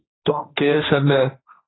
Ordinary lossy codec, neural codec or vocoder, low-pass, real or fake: AAC, 16 kbps; codec, 16 kHz, 1.1 kbps, Voila-Tokenizer; 7.2 kHz; fake